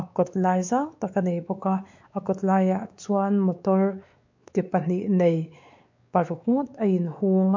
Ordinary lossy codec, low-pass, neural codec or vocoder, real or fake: MP3, 48 kbps; 7.2 kHz; codec, 16 kHz, 4 kbps, X-Codec, WavLM features, trained on Multilingual LibriSpeech; fake